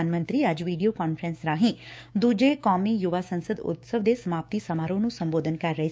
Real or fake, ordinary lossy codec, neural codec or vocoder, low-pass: fake; none; codec, 16 kHz, 6 kbps, DAC; none